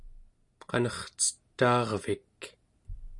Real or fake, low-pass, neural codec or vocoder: real; 10.8 kHz; none